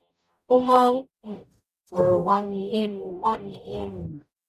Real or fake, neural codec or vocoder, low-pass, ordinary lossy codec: fake; codec, 44.1 kHz, 0.9 kbps, DAC; 14.4 kHz; none